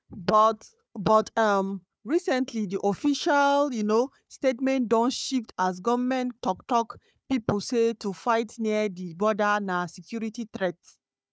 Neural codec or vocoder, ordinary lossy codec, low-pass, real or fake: codec, 16 kHz, 4 kbps, FunCodec, trained on Chinese and English, 50 frames a second; none; none; fake